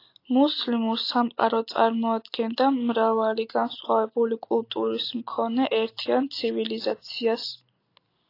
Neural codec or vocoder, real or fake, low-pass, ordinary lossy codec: none; real; 5.4 kHz; AAC, 32 kbps